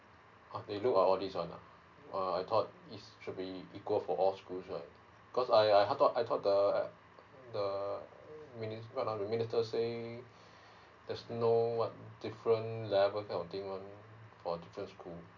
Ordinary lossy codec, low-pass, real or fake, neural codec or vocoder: none; 7.2 kHz; real; none